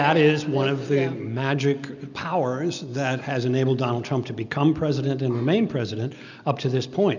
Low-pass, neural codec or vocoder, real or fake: 7.2 kHz; none; real